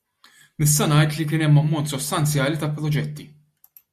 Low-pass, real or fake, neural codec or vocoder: 14.4 kHz; real; none